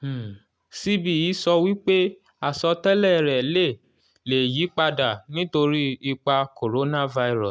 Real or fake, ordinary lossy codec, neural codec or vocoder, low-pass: real; none; none; none